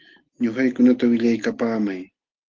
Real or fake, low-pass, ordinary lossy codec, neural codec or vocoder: real; 7.2 kHz; Opus, 16 kbps; none